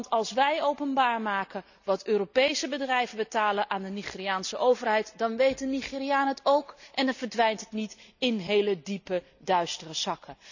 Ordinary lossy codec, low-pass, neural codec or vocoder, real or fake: none; 7.2 kHz; none; real